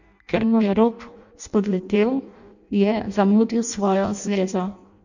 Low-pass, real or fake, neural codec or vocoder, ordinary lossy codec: 7.2 kHz; fake; codec, 16 kHz in and 24 kHz out, 0.6 kbps, FireRedTTS-2 codec; none